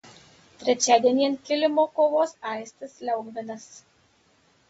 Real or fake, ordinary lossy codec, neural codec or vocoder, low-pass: real; AAC, 24 kbps; none; 19.8 kHz